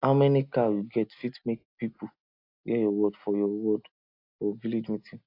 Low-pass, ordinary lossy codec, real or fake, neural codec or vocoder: 5.4 kHz; AAC, 32 kbps; real; none